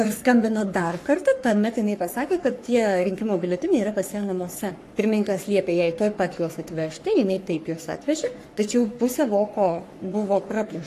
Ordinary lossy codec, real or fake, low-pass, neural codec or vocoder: AAC, 64 kbps; fake; 14.4 kHz; codec, 44.1 kHz, 3.4 kbps, Pupu-Codec